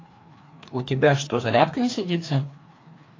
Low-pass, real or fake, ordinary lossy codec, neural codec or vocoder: 7.2 kHz; fake; AAC, 32 kbps; codec, 16 kHz, 2 kbps, FreqCodec, larger model